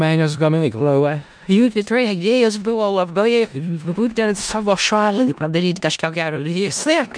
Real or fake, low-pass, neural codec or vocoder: fake; 9.9 kHz; codec, 16 kHz in and 24 kHz out, 0.4 kbps, LongCat-Audio-Codec, four codebook decoder